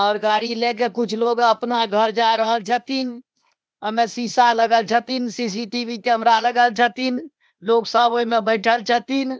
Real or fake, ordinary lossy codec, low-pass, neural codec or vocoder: fake; none; none; codec, 16 kHz, 0.8 kbps, ZipCodec